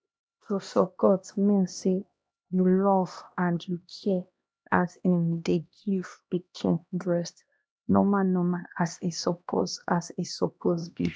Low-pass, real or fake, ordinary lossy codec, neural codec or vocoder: none; fake; none; codec, 16 kHz, 1 kbps, X-Codec, HuBERT features, trained on LibriSpeech